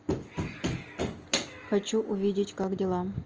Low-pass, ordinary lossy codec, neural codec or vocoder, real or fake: 7.2 kHz; Opus, 24 kbps; none; real